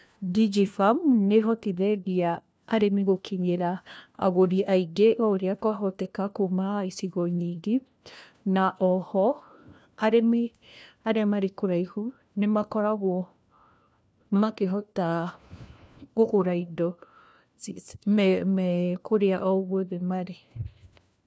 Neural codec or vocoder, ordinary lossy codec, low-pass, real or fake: codec, 16 kHz, 1 kbps, FunCodec, trained on LibriTTS, 50 frames a second; none; none; fake